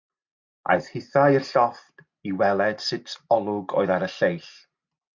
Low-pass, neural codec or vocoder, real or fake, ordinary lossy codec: 7.2 kHz; none; real; MP3, 48 kbps